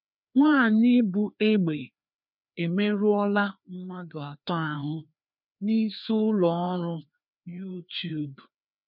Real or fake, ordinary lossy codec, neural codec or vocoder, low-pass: fake; none; codec, 16 kHz, 2 kbps, FreqCodec, larger model; 5.4 kHz